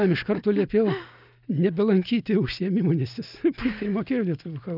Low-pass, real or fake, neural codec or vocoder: 5.4 kHz; real; none